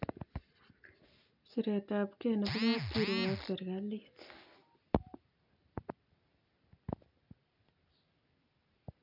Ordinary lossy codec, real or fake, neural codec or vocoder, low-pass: none; real; none; 5.4 kHz